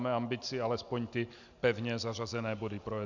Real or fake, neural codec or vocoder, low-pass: real; none; 7.2 kHz